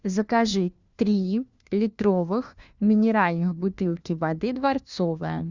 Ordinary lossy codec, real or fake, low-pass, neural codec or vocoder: Opus, 64 kbps; fake; 7.2 kHz; codec, 16 kHz, 1 kbps, FunCodec, trained on LibriTTS, 50 frames a second